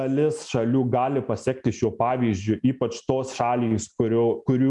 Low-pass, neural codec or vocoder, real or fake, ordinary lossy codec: 10.8 kHz; none; real; MP3, 96 kbps